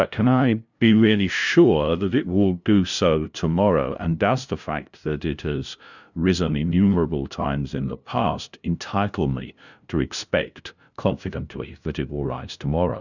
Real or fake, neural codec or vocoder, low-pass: fake; codec, 16 kHz, 0.5 kbps, FunCodec, trained on LibriTTS, 25 frames a second; 7.2 kHz